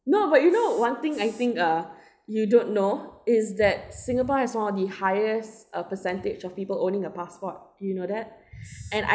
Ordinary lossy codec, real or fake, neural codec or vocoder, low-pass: none; real; none; none